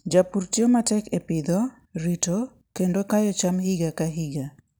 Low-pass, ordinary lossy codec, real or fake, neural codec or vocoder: none; none; real; none